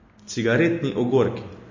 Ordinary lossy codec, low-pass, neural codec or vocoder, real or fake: MP3, 32 kbps; 7.2 kHz; none; real